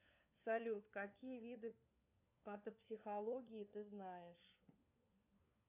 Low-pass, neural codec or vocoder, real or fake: 3.6 kHz; codec, 16 kHz, 4 kbps, FunCodec, trained on LibriTTS, 50 frames a second; fake